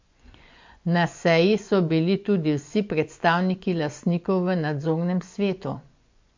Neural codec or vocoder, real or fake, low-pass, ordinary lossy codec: vocoder, 24 kHz, 100 mel bands, Vocos; fake; 7.2 kHz; MP3, 48 kbps